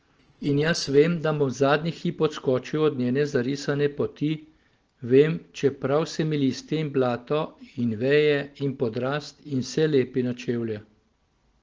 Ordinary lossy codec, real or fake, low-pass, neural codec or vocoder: Opus, 16 kbps; real; 7.2 kHz; none